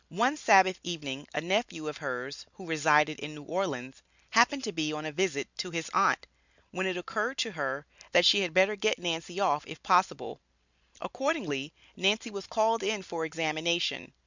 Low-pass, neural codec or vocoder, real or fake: 7.2 kHz; none; real